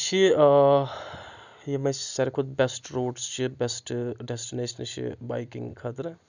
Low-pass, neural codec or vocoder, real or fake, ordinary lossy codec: 7.2 kHz; none; real; none